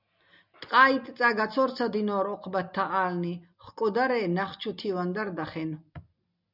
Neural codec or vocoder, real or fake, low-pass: none; real; 5.4 kHz